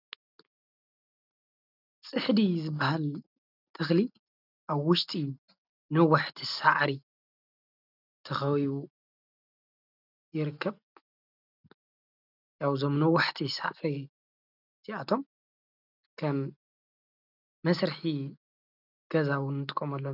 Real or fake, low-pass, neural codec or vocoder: real; 5.4 kHz; none